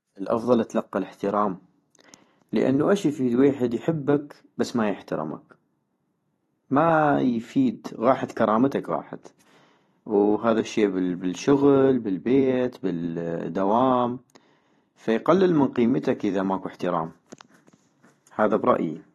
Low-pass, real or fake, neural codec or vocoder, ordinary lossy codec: 19.8 kHz; fake; vocoder, 44.1 kHz, 128 mel bands every 256 samples, BigVGAN v2; AAC, 32 kbps